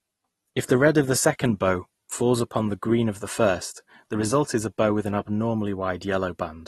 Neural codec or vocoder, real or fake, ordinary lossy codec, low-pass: none; real; AAC, 32 kbps; 19.8 kHz